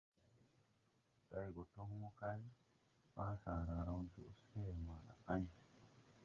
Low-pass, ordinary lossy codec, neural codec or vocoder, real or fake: 7.2 kHz; Opus, 32 kbps; codec, 16 kHz, 8 kbps, FreqCodec, smaller model; fake